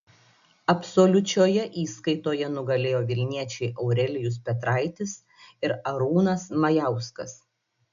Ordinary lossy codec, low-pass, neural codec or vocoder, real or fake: AAC, 96 kbps; 7.2 kHz; none; real